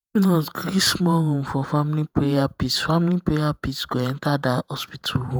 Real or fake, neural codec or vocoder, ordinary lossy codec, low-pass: fake; vocoder, 48 kHz, 128 mel bands, Vocos; none; none